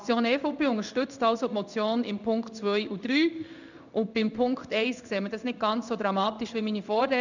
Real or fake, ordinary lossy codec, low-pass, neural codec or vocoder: fake; none; 7.2 kHz; codec, 16 kHz in and 24 kHz out, 1 kbps, XY-Tokenizer